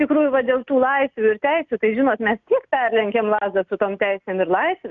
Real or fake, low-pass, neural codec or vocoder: real; 7.2 kHz; none